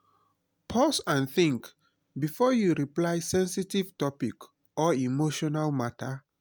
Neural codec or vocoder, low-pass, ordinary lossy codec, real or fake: none; none; none; real